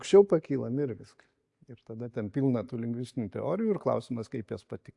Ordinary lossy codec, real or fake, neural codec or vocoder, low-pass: AAC, 64 kbps; real; none; 10.8 kHz